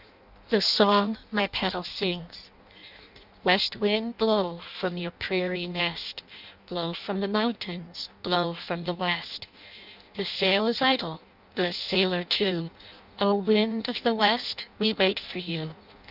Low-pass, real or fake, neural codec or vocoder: 5.4 kHz; fake; codec, 16 kHz in and 24 kHz out, 0.6 kbps, FireRedTTS-2 codec